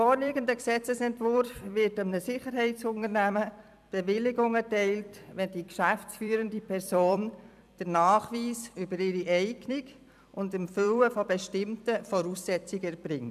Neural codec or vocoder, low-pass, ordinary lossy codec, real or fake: vocoder, 44.1 kHz, 128 mel bands every 256 samples, BigVGAN v2; 14.4 kHz; none; fake